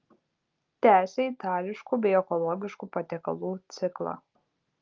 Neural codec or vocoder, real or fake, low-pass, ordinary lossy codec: none; real; 7.2 kHz; Opus, 24 kbps